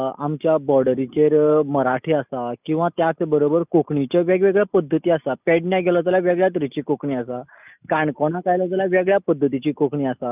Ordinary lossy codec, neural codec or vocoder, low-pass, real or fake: none; none; 3.6 kHz; real